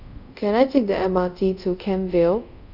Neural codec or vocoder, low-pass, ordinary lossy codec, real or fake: codec, 24 kHz, 0.5 kbps, DualCodec; 5.4 kHz; none; fake